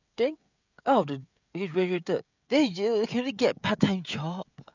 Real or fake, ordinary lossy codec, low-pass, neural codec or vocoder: fake; none; 7.2 kHz; codec, 16 kHz, 16 kbps, FreqCodec, smaller model